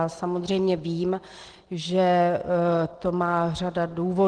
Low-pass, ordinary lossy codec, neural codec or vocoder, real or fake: 9.9 kHz; Opus, 16 kbps; none; real